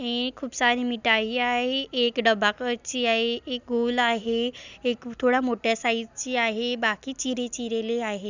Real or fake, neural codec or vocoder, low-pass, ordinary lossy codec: real; none; 7.2 kHz; none